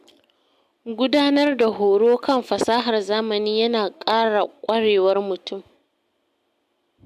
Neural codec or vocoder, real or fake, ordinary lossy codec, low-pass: none; real; MP3, 96 kbps; 14.4 kHz